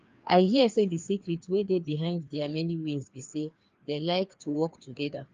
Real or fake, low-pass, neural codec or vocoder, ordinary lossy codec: fake; 7.2 kHz; codec, 16 kHz, 2 kbps, FreqCodec, larger model; Opus, 32 kbps